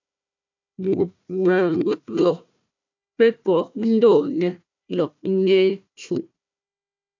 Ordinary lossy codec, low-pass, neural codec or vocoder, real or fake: MP3, 64 kbps; 7.2 kHz; codec, 16 kHz, 1 kbps, FunCodec, trained on Chinese and English, 50 frames a second; fake